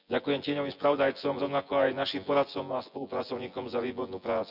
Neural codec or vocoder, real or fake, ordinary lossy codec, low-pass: vocoder, 24 kHz, 100 mel bands, Vocos; fake; none; 5.4 kHz